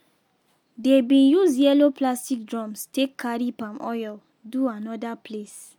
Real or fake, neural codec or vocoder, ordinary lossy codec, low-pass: real; none; MP3, 96 kbps; 19.8 kHz